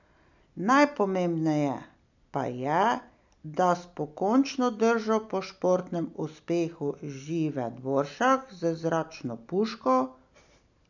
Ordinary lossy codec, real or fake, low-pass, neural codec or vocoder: none; real; 7.2 kHz; none